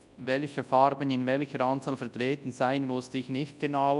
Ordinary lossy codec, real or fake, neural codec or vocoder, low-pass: MP3, 96 kbps; fake; codec, 24 kHz, 0.9 kbps, WavTokenizer, large speech release; 10.8 kHz